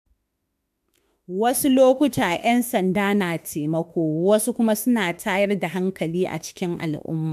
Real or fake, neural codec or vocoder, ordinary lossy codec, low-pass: fake; autoencoder, 48 kHz, 32 numbers a frame, DAC-VAE, trained on Japanese speech; none; 14.4 kHz